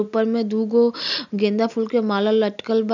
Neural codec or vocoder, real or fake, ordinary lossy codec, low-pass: none; real; none; 7.2 kHz